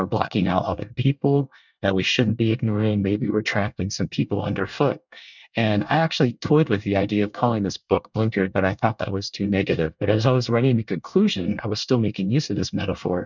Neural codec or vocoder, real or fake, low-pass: codec, 24 kHz, 1 kbps, SNAC; fake; 7.2 kHz